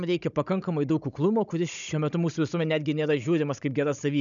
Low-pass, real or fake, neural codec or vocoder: 7.2 kHz; fake; codec, 16 kHz, 16 kbps, FunCodec, trained on Chinese and English, 50 frames a second